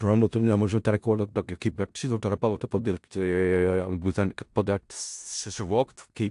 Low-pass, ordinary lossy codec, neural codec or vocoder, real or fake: 10.8 kHz; AAC, 64 kbps; codec, 16 kHz in and 24 kHz out, 0.4 kbps, LongCat-Audio-Codec, four codebook decoder; fake